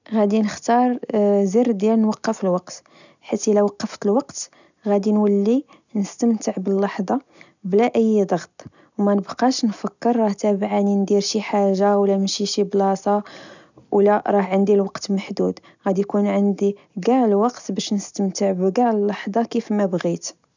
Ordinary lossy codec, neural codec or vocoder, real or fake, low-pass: none; none; real; 7.2 kHz